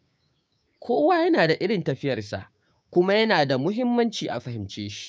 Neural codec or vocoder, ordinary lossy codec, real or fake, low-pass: codec, 16 kHz, 6 kbps, DAC; none; fake; none